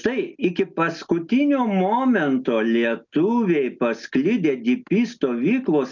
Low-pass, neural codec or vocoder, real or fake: 7.2 kHz; none; real